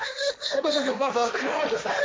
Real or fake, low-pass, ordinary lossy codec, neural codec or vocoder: fake; none; none; codec, 16 kHz, 1.1 kbps, Voila-Tokenizer